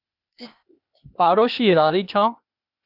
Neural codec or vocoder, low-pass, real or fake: codec, 16 kHz, 0.8 kbps, ZipCodec; 5.4 kHz; fake